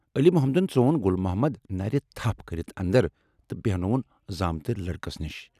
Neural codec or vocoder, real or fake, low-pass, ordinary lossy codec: none; real; 14.4 kHz; none